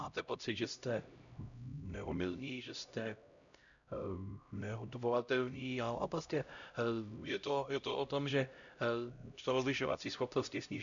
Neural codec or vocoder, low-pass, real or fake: codec, 16 kHz, 0.5 kbps, X-Codec, HuBERT features, trained on LibriSpeech; 7.2 kHz; fake